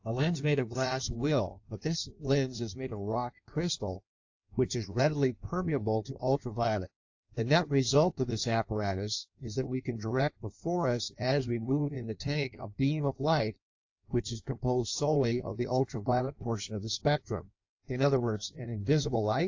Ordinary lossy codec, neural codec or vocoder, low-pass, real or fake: AAC, 48 kbps; codec, 16 kHz in and 24 kHz out, 1.1 kbps, FireRedTTS-2 codec; 7.2 kHz; fake